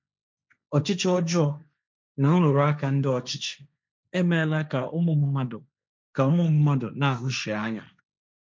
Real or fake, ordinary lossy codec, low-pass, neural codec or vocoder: fake; none; none; codec, 16 kHz, 1.1 kbps, Voila-Tokenizer